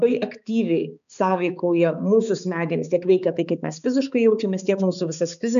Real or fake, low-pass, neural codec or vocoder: fake; 7.2 kHz; codec, 16 kHz, 4 kbps, X-Codec, HuBERT features, trained on balanced general audio